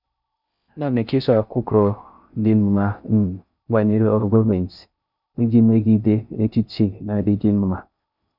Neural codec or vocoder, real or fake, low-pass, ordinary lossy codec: codec, 16 kHz in and 24 kHz out, 0.6 kbps, FocalCodec, streaming, 4096 codes; fake; 5.4 kHz; none